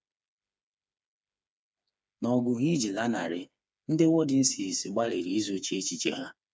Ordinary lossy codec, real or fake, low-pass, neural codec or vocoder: none; fake; none; codec, 16 kHz, 4 kbps, FreqCodec, smaller model